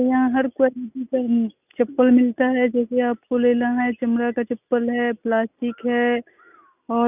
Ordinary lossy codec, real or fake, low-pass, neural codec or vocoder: none; real; 3.6 kHz; none